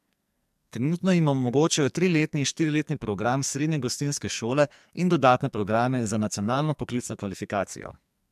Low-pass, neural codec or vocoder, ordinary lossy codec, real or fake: 14.4 kHz; codec, 44.1 kHz, 2.6 kbps, SNAC; MP3, 96 kbps; fake